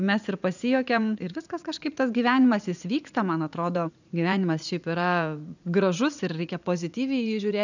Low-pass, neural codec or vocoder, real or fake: 7.2 kHz; vocoder, 44.1 kHz, 80 mel bands, Vocos; fake